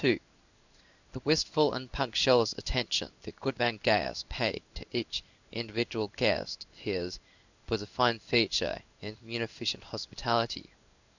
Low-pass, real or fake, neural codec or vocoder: 7.2 kHz; fake; codec, 16 kHz in and 24 kHz out, 1 kbps, XY-Tokenizer